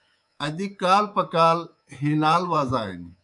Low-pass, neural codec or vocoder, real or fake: 10.8 kHz; codec, 24 kHz, 3.1 kbps, DualCodec; fake